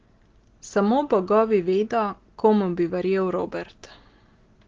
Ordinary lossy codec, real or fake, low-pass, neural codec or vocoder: Opus, 16 kbps; real; 7.2 kHz; none